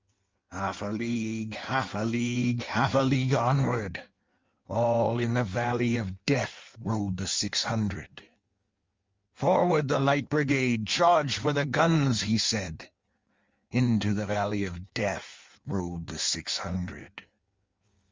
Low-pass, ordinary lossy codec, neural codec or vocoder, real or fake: 7.2 kHz; Opus, 32 kbps; codec, 16 kHz in and 24 kHz out, 1.1 kbps, FireRedTTS-2 codec; fake